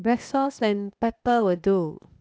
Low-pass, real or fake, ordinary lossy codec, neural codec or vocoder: none; fake; none; codec, 16 kHz, 0.8 kbps, ZipCodec